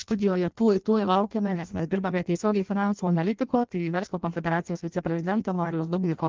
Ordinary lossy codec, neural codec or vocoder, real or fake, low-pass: Opus, 32 kbps; codec, 16 kHz in and 24 kHz out, 0.6 kbps, FireRedTTS-2 codec; fake; 7.2 kHz